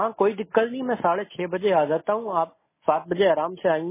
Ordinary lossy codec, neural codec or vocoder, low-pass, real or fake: MP3, 16 kbps; none; 3.6 kHz; real